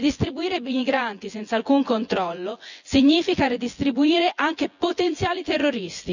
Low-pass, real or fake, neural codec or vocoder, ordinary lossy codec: 7.2 kHz; fake; vocoder, 24 kHz, 100 mel bands, Vocos; none